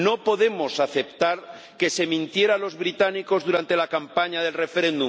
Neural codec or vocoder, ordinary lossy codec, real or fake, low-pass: none; none; real; none